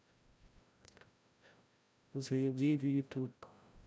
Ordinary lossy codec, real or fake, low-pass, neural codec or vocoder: none; fake; none; codec, 16 kHz, 0.5 kbps, FreqCodec, larger model